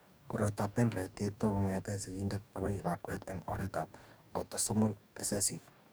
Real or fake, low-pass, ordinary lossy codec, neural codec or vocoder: fake; none; none; codec, 44.1 kHz, 2.6 kbps, DAC